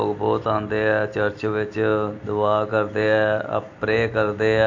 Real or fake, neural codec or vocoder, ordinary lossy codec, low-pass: real; none; AAC, 48 kbps; 7.2 kHz